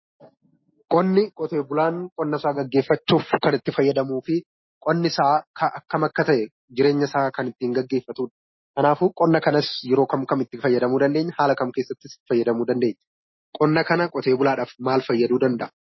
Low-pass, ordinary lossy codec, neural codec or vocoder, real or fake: 7.2 kHz; MP3, 24 kbps; none; real